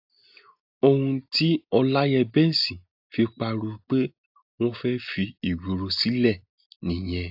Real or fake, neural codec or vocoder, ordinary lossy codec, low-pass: real; none; AAC, 48 kbps; 5.4 kHz